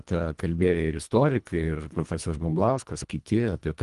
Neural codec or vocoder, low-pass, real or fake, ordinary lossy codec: codec, 24 kHz, 1.5 kbps, HILCodec; 10.8 kHz; fake; Opus, 32 kbps